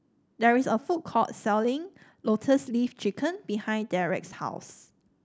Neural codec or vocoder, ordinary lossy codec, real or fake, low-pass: none; none; real; none